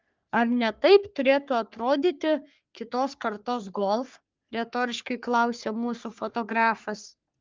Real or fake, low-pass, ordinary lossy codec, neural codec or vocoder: fake; 7.2 kHz; Opus, 24 kbps; codec, 44.1 kHz, 3.4 kbps, Pupu-Codec